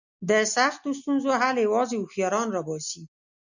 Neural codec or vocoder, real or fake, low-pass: none; real; 7.2 kHz